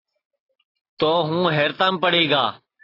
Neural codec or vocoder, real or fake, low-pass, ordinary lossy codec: none; real; 5.4 kHz; AAC, 24 kbps